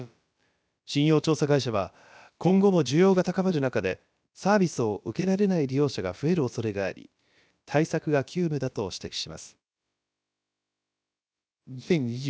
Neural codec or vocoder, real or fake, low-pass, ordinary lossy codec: codec, 16 kHz, about 1 kbps, DyCAST, with the encoder's durations; fake; none; none